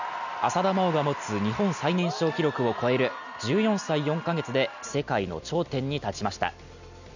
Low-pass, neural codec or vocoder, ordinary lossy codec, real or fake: 7.2 kHz; none; none; real